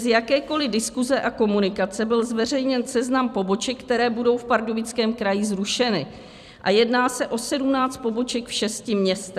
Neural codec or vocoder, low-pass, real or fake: vocoder, 44.1 kHz, 128 mel bands every 256 samples, BigVGAN v2; 14.4 kHz; fake